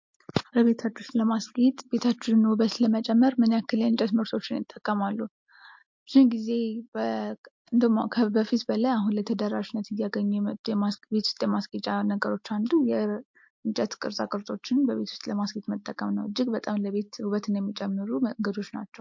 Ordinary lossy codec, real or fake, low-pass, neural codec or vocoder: MP3, 48 kbps; real; 7.2 kHz; none